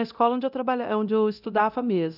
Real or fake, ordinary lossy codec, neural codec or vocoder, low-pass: fake; none; codec, 24 kHz, 0.9 kbps, DualCodec; 5.4 kHz